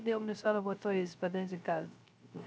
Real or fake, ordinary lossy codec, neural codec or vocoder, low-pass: fake; none; codec, 16 kHz, 0.3 kbps, FocalCodec; none